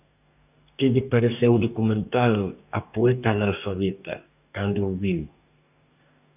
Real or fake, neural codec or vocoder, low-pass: fake; codec, 44.1 kHz, 2.6 kbps, DAC; 3.6 kHz